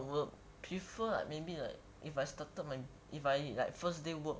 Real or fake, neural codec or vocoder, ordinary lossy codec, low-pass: real; none; none; none